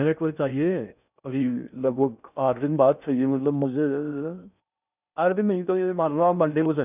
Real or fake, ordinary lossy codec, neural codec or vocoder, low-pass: fake; none; codec, 16 kHz in and 24 kHz out, 0.6 kbps, FocalCodec, streaming, 2048 codes; 3.6 kHz